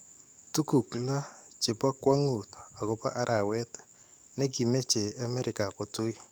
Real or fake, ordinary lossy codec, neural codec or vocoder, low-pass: fake; none; codec, 44.1 kHz, 7.8 kbps, DAC; none